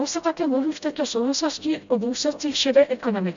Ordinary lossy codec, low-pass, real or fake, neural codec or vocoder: MP3, 64 kbps; 7.2 kHz; fake; codec, 16 kHz, 0.5 kbps, FreqCodec, smaller model